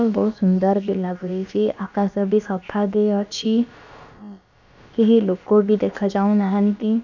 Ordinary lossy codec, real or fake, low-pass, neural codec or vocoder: none; fake; 7.2 kHz; codec, 16 kHz, about 1 kbps, DyCAST, with the encoder's durations